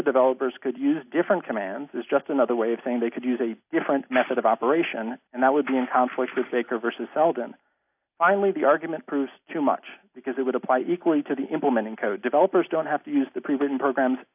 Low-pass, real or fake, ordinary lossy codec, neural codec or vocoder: 3.6 kHz; real; AAC, 32 kbps; none